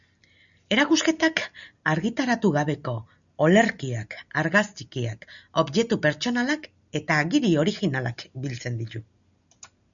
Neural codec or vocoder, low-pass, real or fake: none; 7.2 kHz; real